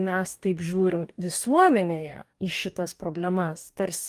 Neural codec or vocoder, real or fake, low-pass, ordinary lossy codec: codec, 44.1 kHz, 2.6 kbps, DAC; fake; 14.4 kHz; Opus, 32 kbps